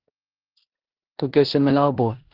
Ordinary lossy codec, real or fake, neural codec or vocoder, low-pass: Opus, 16 kbps; fake; codec, 16 kHz in and 24 kHz out, 0.9 kbps, LongCat-Audio-Codec, four codebook decoder; 5.4 kHz